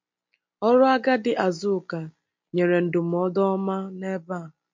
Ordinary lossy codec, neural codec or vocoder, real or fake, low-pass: MP3, 48 kbps; none; real; 7.2 kHz